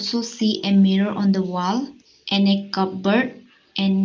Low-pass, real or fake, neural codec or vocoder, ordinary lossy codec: 7.2 kHz; real; none; Opus, 24 kbps